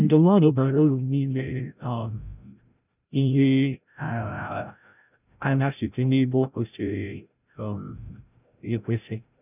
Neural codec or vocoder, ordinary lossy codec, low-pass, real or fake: codec, 16 kHz, 0.5 kbps, FreqCodec, larger model; none; 3.6 kHz; fake